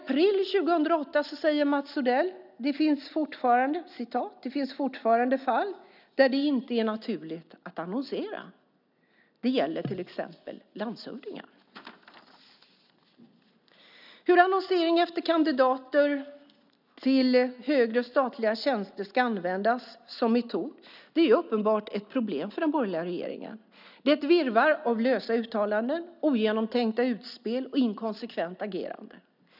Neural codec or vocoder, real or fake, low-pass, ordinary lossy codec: none; real; 5.4 kHz; none